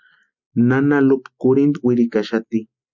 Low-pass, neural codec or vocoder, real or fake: 7.2 kHz; none; real